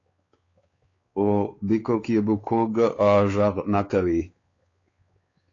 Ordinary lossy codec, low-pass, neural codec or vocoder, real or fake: AAC, 32 kbps; 7.2 kHz; codec, 16 kHz, 4 kbps, X-Codec, WavLM features, trained on Multilingual LibriSpeech; fake